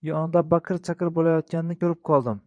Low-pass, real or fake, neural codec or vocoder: 9.9 kHz; fake; vocoder, 22.05 kHz, 80 mel bands, Vocos